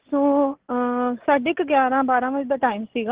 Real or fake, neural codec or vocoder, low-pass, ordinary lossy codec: real; none; 3.6 kHz; Opus, 16 kbps